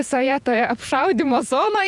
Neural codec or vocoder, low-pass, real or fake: vocoder, 48 kHz, 128 mel bands, Vocos; 10.8 kHz; fake